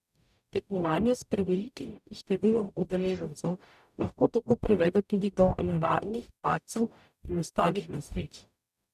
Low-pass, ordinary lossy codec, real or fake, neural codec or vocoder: 14.4 kHz; none; fake; codec, 44.1 kHz, 0.9 kbps, DAC